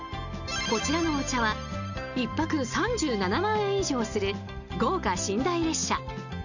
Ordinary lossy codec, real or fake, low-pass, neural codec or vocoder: none; real; 7.2 kHz; none